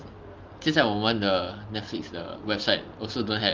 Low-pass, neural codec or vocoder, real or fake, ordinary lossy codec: 7.2 kHz; none; real; Opus, 32 kbps